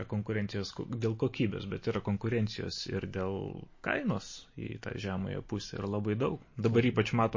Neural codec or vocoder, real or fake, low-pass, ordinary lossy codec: none; real; 7.2 kHz; MP3, 32 kbps